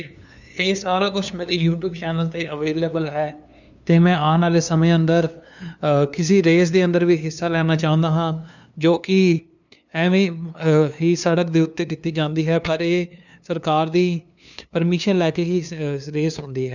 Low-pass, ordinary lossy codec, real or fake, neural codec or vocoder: 7.2 kHz; none; fake; codec, 16 kHz, 2 kbps, FunCodec, trained on LibriTTS, 25 frames a second